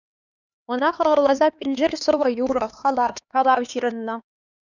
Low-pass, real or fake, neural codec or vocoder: 7.2 kHz; fake; codec, 16 kHz, 2 kbps, X-Codec, HuBERT features, trained on LibriSpeech